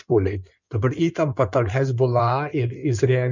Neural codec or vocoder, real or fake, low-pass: codec, 16 kHz in and 24 kHz out, 2.2 kbps, FireRedTTS-2 codec; fake; 7.2 kHz